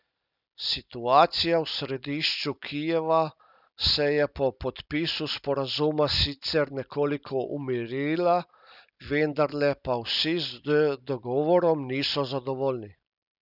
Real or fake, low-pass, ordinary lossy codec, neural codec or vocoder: real; 5.4 kHz; none; none